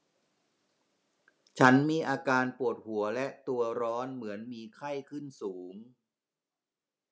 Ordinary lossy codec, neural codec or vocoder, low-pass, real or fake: none; none; none; real